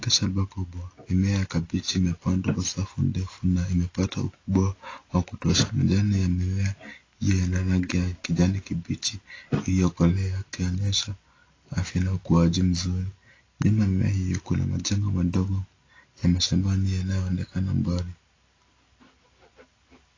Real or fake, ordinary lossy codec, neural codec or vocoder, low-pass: real; AAC, 32 kbps; none; 7.2 kHz